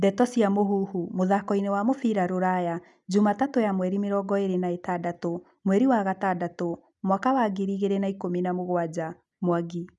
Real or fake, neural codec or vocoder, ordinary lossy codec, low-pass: real; none; none; 10.8 kHz